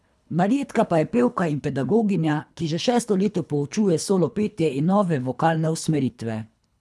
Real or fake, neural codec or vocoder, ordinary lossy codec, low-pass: fake; codec, 24 kHz, 3 kbps, HILCodec; none; none